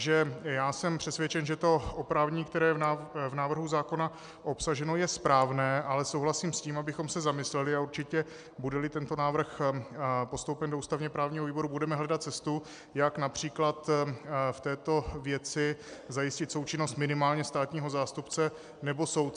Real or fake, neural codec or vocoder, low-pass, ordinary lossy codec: real; none; 9.9 kHz; MP3, 96 kbps